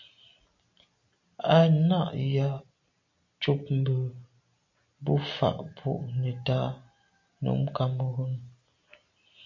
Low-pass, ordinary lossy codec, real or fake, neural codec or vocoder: 7.2 kHz; MP3, 48 kbps; real; none